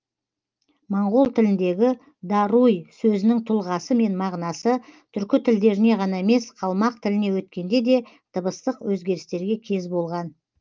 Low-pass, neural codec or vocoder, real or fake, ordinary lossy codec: 7.2 kHz; none; real; Opus, 32 kbps